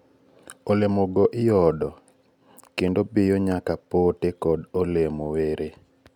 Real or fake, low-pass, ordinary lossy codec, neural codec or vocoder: real; 19.8 kHz; none; none